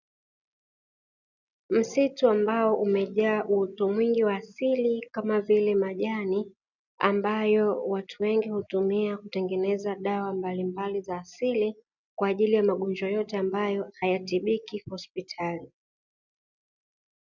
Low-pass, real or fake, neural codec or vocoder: 7.2 kHz; real; none